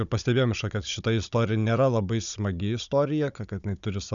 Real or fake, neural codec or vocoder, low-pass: fake; codec, 16 kHz, 16 kbps, FunCodec, trained on Chinese and English, 50 frames a second; 7.2 kHz